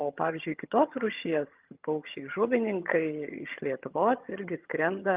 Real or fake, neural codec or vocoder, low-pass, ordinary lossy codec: fake; vocoder, 22.05 kHz, 80 mel bands, HiFi-GAN; 3.6 kHz; Opus, 32 kbps